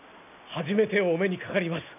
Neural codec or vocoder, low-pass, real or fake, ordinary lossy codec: none; 3.6 kHz; real; none